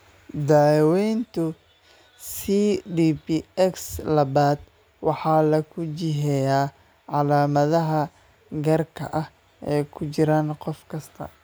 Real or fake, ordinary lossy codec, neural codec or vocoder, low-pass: real; none; none; none